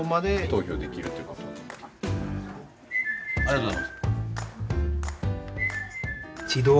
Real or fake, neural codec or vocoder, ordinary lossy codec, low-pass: real; none; none; none